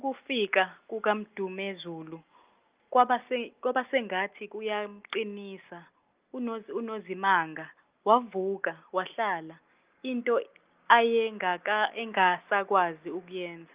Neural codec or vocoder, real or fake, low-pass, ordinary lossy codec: none; real; 3.6 kHz; Opus, 24 kbps